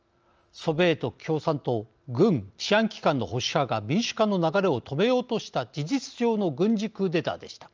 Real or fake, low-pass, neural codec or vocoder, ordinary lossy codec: real; 7.2 kHz; none; Opus, 24 kbps